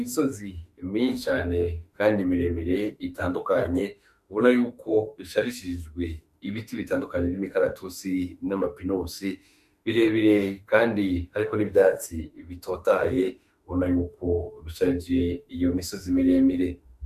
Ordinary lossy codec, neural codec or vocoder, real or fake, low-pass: MP3, 96 kbps; autoencoder, 48 kHz, 32 numbers a frame, DAC-VAE, trained on Japanese speech; fake; 14.4 kHz